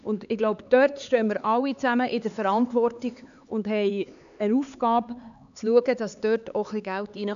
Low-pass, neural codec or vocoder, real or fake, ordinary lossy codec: 7.2 kHz; codec, 16 kHz, 4 kbps, X-Codec, HuBERT features, trained on LibriSpeech; fake; MP3, 96 kbps